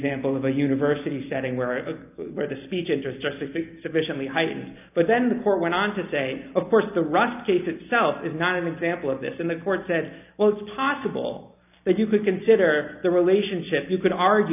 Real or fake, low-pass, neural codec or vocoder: real; 3.6 kHz; none